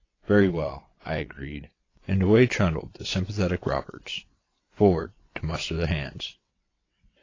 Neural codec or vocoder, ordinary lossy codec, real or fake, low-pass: vocoder, 22.05 kHz, 80 mel bands, WaveNeXt; AAC, 32 kbps; fake; 7.2 kHz